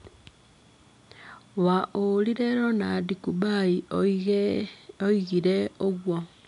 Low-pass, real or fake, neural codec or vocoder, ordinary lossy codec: 10.8 kHz; real; none; none